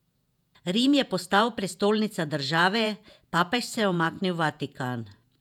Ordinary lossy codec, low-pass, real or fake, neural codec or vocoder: none; 19.8 kHz; fake; vocoder, 48 kHz, 128 mel bands, Vocos